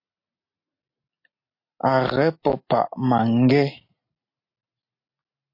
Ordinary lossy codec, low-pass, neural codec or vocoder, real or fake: MP3, 32 kbps; 5.4 kHz; none; real